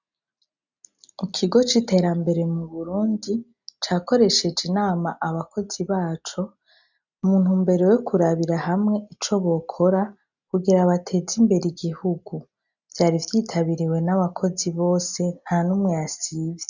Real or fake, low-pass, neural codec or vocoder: real; 7.2 kHz; none